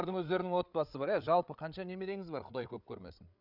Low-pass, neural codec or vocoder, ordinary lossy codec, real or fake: 5.4 kHz; codec, 16 kHz, 8 kbps, FreqCodec, larger model; none; fake